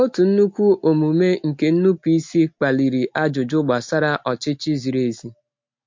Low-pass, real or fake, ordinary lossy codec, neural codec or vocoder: 7.2 kHz; real; MP3, 48 kbps; none